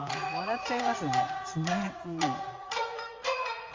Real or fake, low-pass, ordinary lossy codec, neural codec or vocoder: fake; 7.2 kHz; Opus, 32 kbps; vocoder, 44.1 kHz, 80 mel bands, Vocos